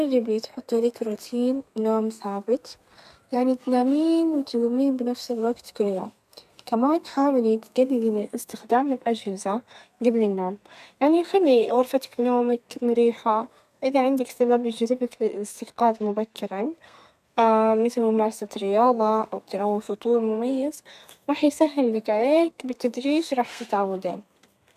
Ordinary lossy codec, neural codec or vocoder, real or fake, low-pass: none; codec, 32 kHz, 1.9 kbps, SNAC; fake; 14.4 kHz